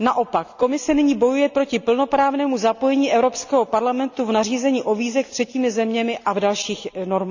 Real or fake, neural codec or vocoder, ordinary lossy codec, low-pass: real; none; none; 7.2 kHz